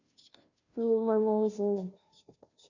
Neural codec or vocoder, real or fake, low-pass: codec, 16 kHz, 0.5 kbps, FunCodec, trained on Chinese and English, 25 frames a second; fake; 7.2 kHz